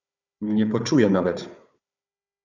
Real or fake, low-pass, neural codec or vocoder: fake; 7.2 kHz; codec, 16 kHz, 4 kbps, FunCodec, trained on Chinese and English, 50 frames a second